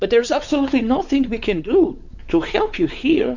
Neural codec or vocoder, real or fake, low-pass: codec, 16 kHz, 4 kbps, X-Codec, WavLM features, trained on Multilingual LibriSpeech; fake; 7.2 kHz